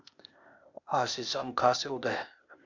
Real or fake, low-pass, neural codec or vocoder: fake; 7.2 kHz; codec, 16 kHz, 0.8 kbps, ZipCodec